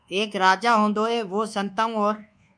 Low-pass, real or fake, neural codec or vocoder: 9.9 kHz; fake; codec, 24 kHz, 1.2 kbps, DualCodec